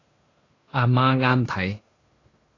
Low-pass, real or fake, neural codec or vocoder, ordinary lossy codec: 7.2 kHz; fake; codec, 16 kHz in and 24 kHz out, 0.9 kbps, LongCat-Audio-Codec, fine tuned four codebook decoder; AAC, 32 kbps